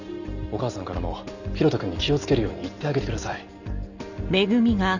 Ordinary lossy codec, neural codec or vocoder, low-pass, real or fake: none; none; 7.2 kHz; real